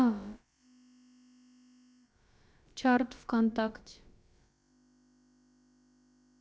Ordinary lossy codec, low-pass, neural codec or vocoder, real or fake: none; none; codec, 16 kHz, about 1 kbps, DyCAST, with the encoder's durations; fake